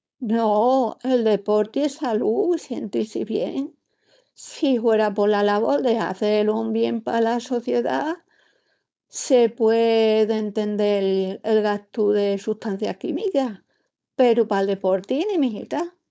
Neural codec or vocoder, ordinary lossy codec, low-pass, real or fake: codec, 16 kHz, 4.8 kbps, FACodec; none; none; fake